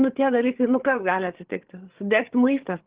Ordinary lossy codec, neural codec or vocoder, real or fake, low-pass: Opus, 16 kbps; codec, 16 kHz, 8 kbps, FreqCodec, larger model; fake; 3.6 kHz